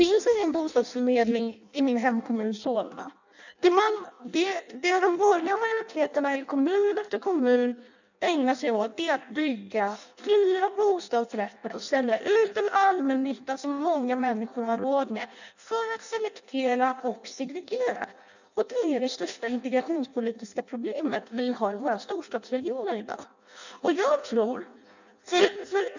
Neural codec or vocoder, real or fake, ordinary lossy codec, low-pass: codec, 16 kHz in and 24 kHz out, 0.6 kbps, FireRedTTS-2 codec; fake; none; 7.2 kHz